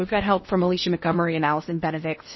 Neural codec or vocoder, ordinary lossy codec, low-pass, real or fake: codec, 16 kHz in and 24 kHz out, 0.8 kbps, FocalCodec, streaming, 65536 codes; MP3, 24 kbps; 7.2 kHz; fake